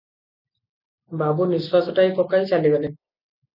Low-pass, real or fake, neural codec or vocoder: 5.4 kHz; real; none